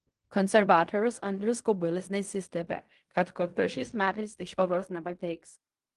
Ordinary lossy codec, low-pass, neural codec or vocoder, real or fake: Opus, 24 kbps; 10.8 kHz; codec, 16 kHz in and 24 kHz out, 0.4 kbps, LongCat-Audio-Codec, fine tuned four codebook decoder; fake